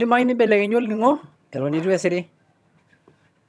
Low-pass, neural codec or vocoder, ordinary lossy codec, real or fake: none; vocoder, 22.05 kHz, 80 mel bands, HiFi-GAN; none; fake